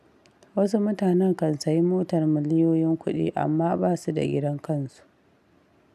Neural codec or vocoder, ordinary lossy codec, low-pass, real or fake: none; none; 14.4 kHz; real